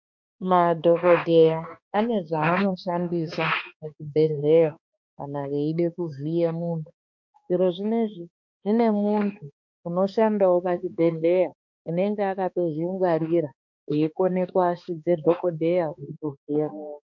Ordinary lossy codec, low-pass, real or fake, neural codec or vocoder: MP3, 48 kbps; 7.2 kHz; fake; codec, 16 kHz, 2 kbps, X-Codec, HuBERT features, trained on balanced general audio